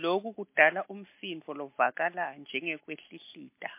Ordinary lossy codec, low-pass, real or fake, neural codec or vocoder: MP3, 24 kbps; 3.6 kHz; real; none